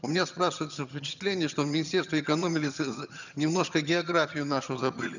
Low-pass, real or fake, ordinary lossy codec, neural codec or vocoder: 7.2 kHz; fake; none; vocoder, 22.05 kHz, 80 mel bands, HiFi-GAN